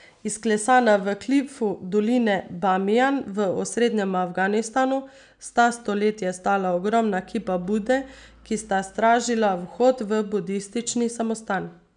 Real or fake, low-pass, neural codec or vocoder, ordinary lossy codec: real; 9.9 kHz; none; none